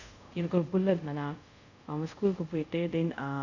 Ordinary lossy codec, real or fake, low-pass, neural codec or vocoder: none; fake; 7.2 kHz; codec, 24 kHz, 0.5 kbps, DualCodec